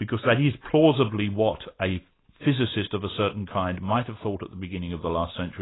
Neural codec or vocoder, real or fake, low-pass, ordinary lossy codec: none; real; 7.2 kHz; AAC, 16 kbps